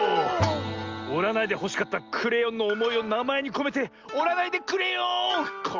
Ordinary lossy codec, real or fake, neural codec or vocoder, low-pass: Opus, 32 kbps; real; none; 7.2 kHz